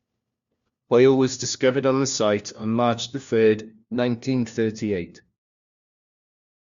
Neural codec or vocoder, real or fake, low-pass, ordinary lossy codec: codec, 16 kHz, 1 kbps, FunCodec, trained on LibriTTS, 50 frames a second; fake; 7.2 kHz; Opus, 64 kbps